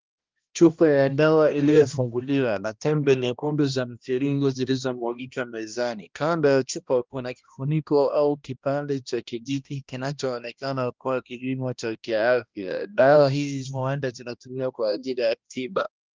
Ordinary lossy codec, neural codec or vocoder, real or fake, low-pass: Opus, 24 kbps; codec, 16 kHz, 1 kbps, X-Codec, HuBERT features, trained on balanced general audio; fake; 7.2 kHz